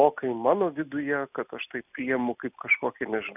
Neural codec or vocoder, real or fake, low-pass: none; real; 3.6 kHz